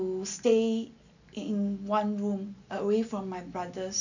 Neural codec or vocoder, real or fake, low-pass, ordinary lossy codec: none; real; 7.2 kHz; none